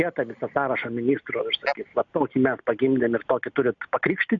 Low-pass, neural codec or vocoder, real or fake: 7.2 kHz; none; real